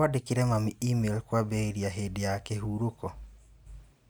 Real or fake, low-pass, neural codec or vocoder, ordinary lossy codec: real; none; none; none